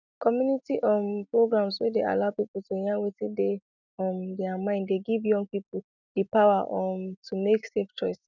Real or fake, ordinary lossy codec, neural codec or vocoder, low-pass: real; none; none; 7.2 kHz